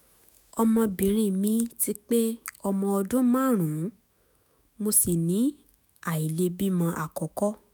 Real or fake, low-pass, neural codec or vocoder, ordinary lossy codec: fake; none; autoencoder, 48 kHz, 128 numbers a frame, DAC-VAE, trained on Japanese speech; none